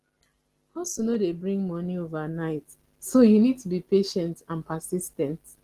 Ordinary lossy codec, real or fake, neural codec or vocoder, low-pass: Opus, 16 kbps; real; none; 14.4 kHz